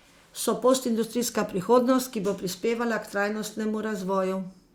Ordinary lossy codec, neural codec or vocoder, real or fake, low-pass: Opus, 64 kbps; none; real; 19.8 kHz